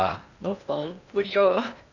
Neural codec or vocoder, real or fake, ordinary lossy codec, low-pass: codec, 16 kHz in and 24 kHz out, 0.8 kbps, FocalCodec, streaming, 65536 codes; fake; none; 7.2 kHz